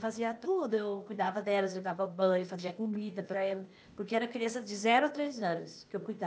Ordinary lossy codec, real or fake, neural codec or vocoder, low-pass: none; fake; codec, 16 kHz, 0.8 kbps, ZipCodec; none